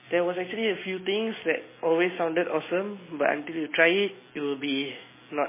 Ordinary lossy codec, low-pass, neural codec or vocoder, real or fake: MP3, 16 kbps; 3.6 kHz; none; real